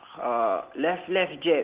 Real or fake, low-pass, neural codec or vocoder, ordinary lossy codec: real; 3.6 kHz; none; Opus, 16 kbps